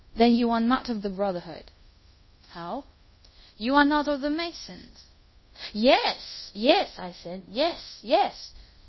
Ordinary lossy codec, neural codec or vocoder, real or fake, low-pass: MP3, 24 kbps; codec, 24 kHz, 0.5 kbps, DualCodec; fake; 7.2 kHz